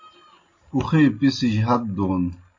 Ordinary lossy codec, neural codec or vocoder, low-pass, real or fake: MP3, 32 kbps; none; 7.2 kHz; real